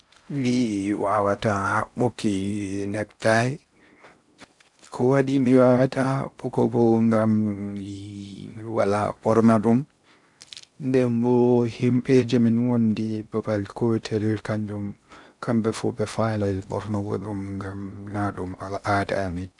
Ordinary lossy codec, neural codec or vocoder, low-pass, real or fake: none; codec, 16 kHz in and 24 kHz out, 0.6 kbps, FocalCodec, streaming, 4096 codes; 10.8 kHz; fake